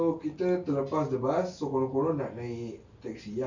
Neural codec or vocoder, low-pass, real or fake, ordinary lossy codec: none; 7.2 kHz; real; none